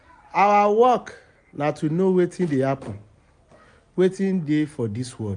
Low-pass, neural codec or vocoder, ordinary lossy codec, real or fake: 9.9 kHz; none; none; real